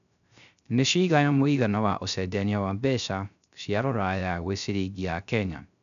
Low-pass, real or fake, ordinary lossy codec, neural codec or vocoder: 7.2 kHz; fake; none; codec, 16 kHz, 0.3 kbps, FocalCodec